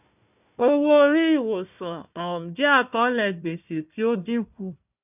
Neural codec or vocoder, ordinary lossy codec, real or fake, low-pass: codec, 16 kHz, 1 kbps, FunCodec, trained on Chinese and English, 50 frames a second; none; fake; 3.6 kHz